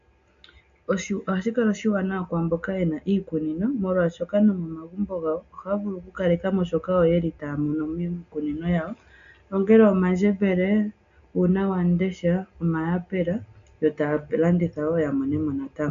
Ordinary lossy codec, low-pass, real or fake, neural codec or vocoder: AAC, 64 kbps; 7.2 kHz; real; none